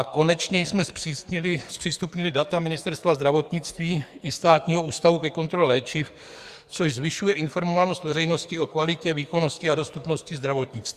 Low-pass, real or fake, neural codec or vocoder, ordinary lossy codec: 14.4 kHz; fake; codec, 44.1 kHz, 2.6 kbps, SNAC; Opus, 64 kbps